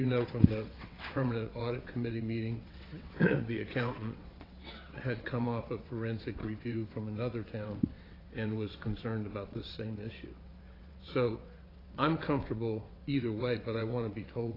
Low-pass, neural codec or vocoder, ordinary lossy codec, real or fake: 5.4 kHz; vocoder, 22.05 kHz, 80 mel bands, WaveNeXt; AAC, 24 kbps; fake